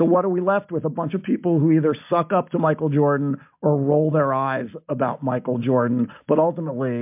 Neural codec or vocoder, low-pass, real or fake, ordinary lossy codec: none; 3.6 kHz; real; MP3, 32 kbps